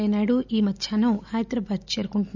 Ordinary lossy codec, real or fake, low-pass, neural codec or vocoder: none; real; 7.2 kHz; none